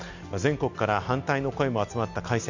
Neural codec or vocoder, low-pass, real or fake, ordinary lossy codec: none; 7.2 kHz; real; none